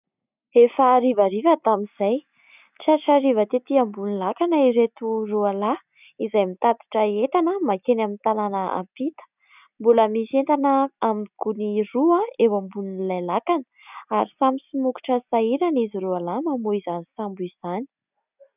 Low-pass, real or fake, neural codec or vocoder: 3.6 kHz; real; none